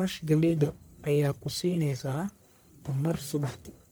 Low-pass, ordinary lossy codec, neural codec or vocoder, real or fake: none; none; codec, 44.1 kHz, 1.7 kbps, Pupu-Codec; fake